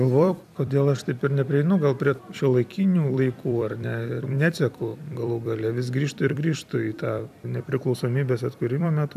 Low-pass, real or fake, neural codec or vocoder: 14.4 kHz; fake; vocoder, 44.1 kHz, 128 mel bands every 256 samples, BigVGAN v2